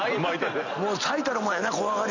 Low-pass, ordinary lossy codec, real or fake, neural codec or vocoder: 7.2 kHz; none; real; none